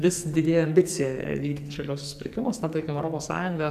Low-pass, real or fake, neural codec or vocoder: 14.4 kHz; fake; codec, 44.1 kHz, 2.6 kbps, SNAC